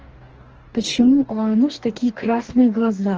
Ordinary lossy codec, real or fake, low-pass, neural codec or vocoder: Opus, 16 kbps; fake; 7.2 kHz; codec, 44.1 kHz, 2.6 kbps, DAC